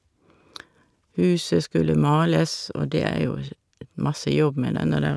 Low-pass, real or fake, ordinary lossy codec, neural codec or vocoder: none; real; none; none